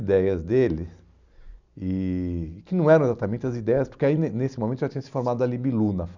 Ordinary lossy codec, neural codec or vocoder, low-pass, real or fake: none; none; 7.2 kHz; real